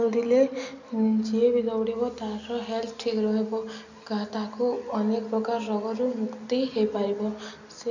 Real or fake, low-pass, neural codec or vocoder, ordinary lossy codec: real; 7.2 kHz; none; none